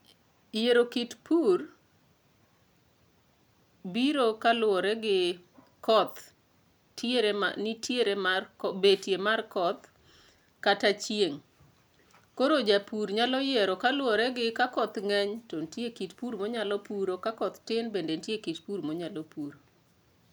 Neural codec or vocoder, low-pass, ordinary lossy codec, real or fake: none; none; none; real